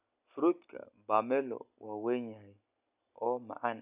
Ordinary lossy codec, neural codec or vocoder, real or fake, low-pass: none; none; real; 3.6 kHz